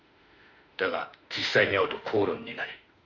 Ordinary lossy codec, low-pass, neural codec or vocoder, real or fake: none; 7.2 kHz; autoencoder, 48 kHz, 32 numbers a frame, DAC-VAE, trained on Japanese speech; fake